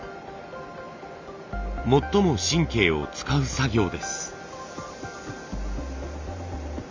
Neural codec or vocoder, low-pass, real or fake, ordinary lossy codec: none; 7.2 kHz; real; MP3, 64 kbps